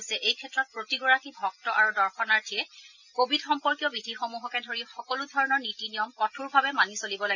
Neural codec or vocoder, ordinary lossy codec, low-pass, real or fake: none; none; 7.2 kHz; real